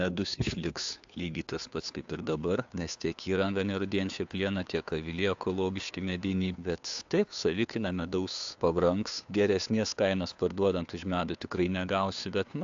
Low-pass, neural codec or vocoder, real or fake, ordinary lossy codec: 7.2 kHz; codec, 16 kHz, 2 kbps, FunCodec, trained on Chinese and English, 25 frames a second; fake; AAC, 64 kbps